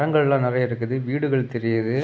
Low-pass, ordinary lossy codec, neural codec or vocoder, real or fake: none; none; none; real